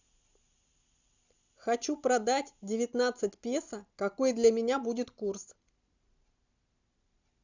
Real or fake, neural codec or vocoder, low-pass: real; none; 7.2 kHz